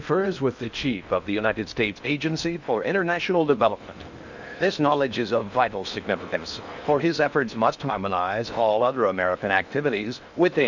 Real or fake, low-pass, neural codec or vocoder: fake; 7.2 kHz; codec, 16 kHz in and 24 kHz out, 0.8 kbps, FocalCodec, streaming, 65536 codes